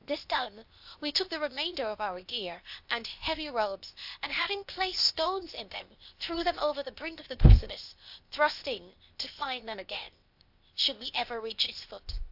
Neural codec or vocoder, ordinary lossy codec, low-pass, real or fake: codec, 16 kHz, 0.8 kbps, ZipCodec; AAC, 48 kbps; 5.4 kHz; fake